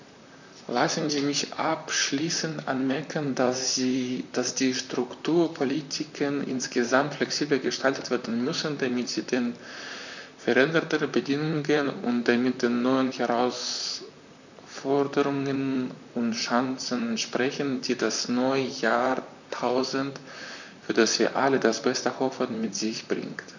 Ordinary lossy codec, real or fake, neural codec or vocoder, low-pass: none; fake; vocoder, 44.1 kHz, 128 mel bands, Pupu-Vocoder; 7.2 kHz